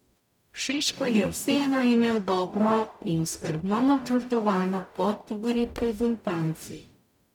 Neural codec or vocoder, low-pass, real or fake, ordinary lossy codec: codec, 44.1 kHz, 0.9 kbps, DAC; 19.8 kHz; fake; none